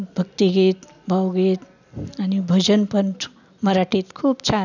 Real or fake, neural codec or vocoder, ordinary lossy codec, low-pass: real; none; none; 7.2 kHz